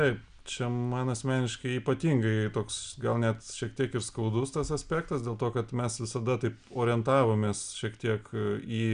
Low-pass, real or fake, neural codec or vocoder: 9.9 kHz; real; none